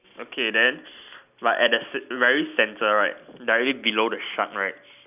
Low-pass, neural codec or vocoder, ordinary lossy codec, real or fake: 3.6 kHz; none; none; real